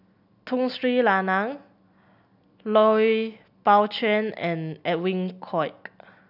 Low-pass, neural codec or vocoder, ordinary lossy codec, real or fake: 5.4 kHz; none; none; real